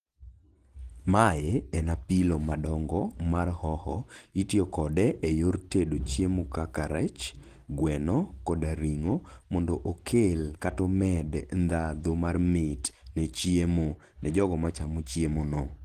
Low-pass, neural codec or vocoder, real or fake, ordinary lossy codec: 14.4 kHz; none; real; Opus, 16 kbps